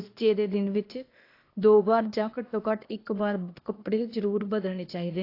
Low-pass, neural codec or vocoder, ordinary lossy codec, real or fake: 5.4 kHz; codec, 16 kHz, 0.8 kbps, ZipCodec; AAC, 32 kbps; fake